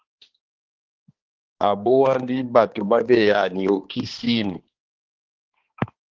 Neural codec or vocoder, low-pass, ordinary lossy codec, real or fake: codec, 16 kHz, 2 kbps, X-Codec, HuBERT features, trained on balanced general audio; 7.2 kHz; Opus, 16 kbps; fake